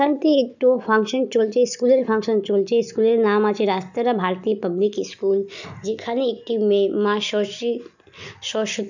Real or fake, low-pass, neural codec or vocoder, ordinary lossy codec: real; 7.2 kHz; none; none